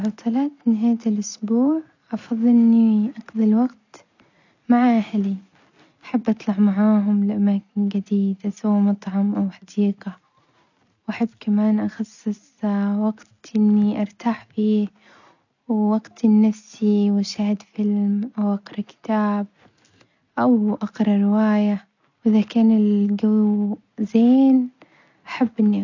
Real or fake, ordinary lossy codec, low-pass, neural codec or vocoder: real; none; 7.2 kHz; none